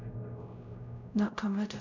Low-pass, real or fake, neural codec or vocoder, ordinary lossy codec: 7.2 kHz; fake; codec, 16 kHz, 0.5 kbps, X-Codec, WavLM features, trained on Multilingual LibriSpeech; none